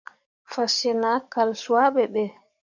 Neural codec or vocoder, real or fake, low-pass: codec, 44.1 kHz, 7.8 kbps, DAC; fake; 7.2 kHz